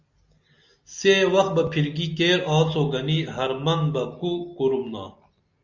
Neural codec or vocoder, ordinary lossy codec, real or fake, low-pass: none; Opus, 64 kbps; real; 7.2 kHz